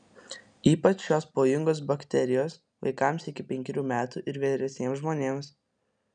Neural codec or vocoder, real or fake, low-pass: none; real; 9.9 kHz